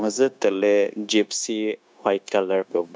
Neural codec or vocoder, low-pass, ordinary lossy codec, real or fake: codec, 16 kHz, 0.9 kbps, LongCat-Audio-Codec; none; none; fake